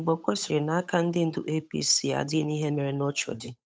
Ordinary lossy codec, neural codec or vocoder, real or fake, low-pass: none; codec, 16 kHz, 8 kbps, FunCodec, trained on Chinese and English, 25 frames a second; fake; none